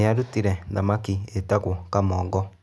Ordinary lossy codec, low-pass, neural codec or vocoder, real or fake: none; none; none; real